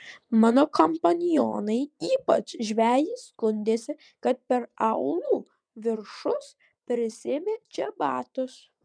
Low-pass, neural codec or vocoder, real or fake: 9.9 kHz; vocoder, 22.05 kHz, 80 mel bands, WaveNeXt; fake